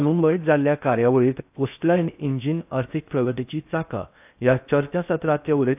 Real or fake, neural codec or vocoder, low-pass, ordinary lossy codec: fake; codec, 16 kHz in and 24 kHz out, 0.6 kbps, FocalCodec, streaming, 4096 codes; 3.6 kHz; AAC, 32 kbps